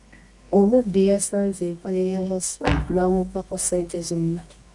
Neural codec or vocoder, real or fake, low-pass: codec, 24 kHz, 0.9 kbps, WavTokenizer, medium music audio release; fake; 10.8 kHz